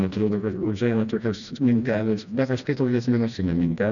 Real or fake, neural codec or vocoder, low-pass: fake; codec, 16 kHz, 1 kbps, FreqCodec, smaller model; 7.2 kHz